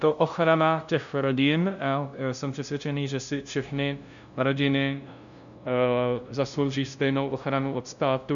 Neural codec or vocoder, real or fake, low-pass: codec, 16 kHz, 0.5 kbps, FunCodec, trained on LibriTTS, 25 frames a second; fake; 7.2 kHz